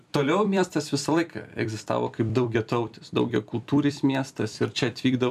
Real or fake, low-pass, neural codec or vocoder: fake; 14.4 kHz; vocoder, 44.1 kHz, 128 mel bands every 256 samples, BigVGAN v2